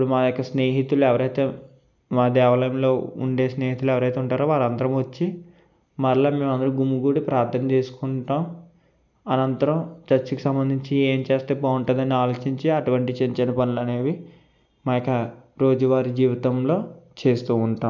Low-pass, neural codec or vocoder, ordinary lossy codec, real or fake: 7.2 kHz; none; none; real